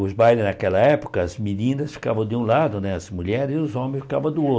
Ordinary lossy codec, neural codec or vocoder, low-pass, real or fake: none; none; none; real